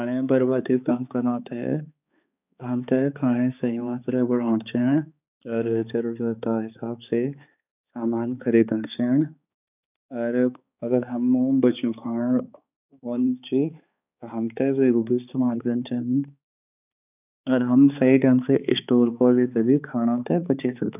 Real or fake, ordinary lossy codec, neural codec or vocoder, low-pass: fake; none; codec, 16 kHz, 4 kbps, X-Codec, HuBERT features, trained on balanced general audio; 3.6 kHz